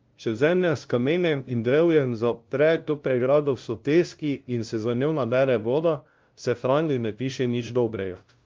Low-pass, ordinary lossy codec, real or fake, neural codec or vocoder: 7.2 kHz; Opus, 24 kbps; fake; codec, 16 kHz, 0.5 kbps, FunCodec, trained on LibriTTS, 25 frames a second